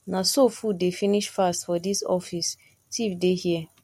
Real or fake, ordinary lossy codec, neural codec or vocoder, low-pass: real; MP3, 64 kbps; none; 19.8 kHz